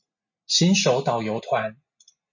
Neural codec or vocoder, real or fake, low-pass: none; real; 7.2 kHz